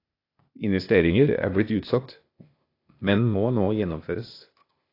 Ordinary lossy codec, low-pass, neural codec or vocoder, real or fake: AAC, 32 kbps; 5.4 kHz; codec, 16 kHz, 0.8 kbps, ZipCodec; fake